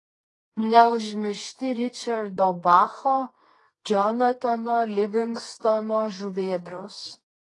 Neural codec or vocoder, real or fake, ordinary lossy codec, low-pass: codec, 24 kHz, 0.9 kbps, WavTokenizer, medium music audio release; fake; AAC, 32 kbps; 10.8 kHz